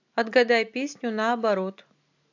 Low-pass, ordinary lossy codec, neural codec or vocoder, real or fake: 7.2 kHz; AAC, 48 kbps; none; real